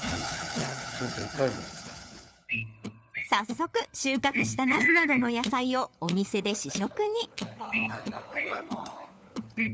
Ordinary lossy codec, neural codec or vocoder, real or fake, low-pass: none; codec, 16 kHz, 4 kbps, FunCodec, trained on LibriTTS, 50 frames a second; fake; none